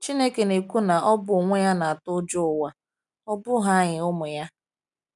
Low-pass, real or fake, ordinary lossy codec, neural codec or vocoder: 10.8 kHz; real; none; none